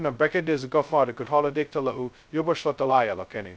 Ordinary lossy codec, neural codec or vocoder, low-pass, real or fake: none; codec, 16 kHz, 0.2 kbps, FocalCodec; none; fake